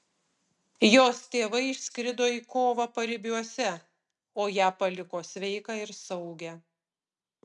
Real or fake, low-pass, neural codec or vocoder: real; 10.8 kHz; none